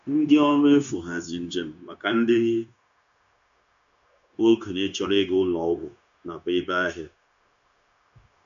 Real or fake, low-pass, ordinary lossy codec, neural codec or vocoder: fake; 7.2 kHz; AAC, 96 kbps; codec, 16 kHz, 0.9 kbps, LongCat-Audio-Codec